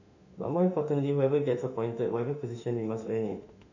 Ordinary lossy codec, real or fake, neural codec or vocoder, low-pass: none; fake; autoencoder, 48 kHz, 32 numbers a frame, DAC-VAE, trained on Japanese speech; 7.2 kHz